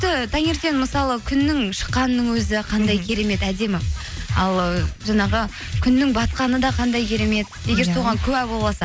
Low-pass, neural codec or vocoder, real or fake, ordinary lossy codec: none; none; real; none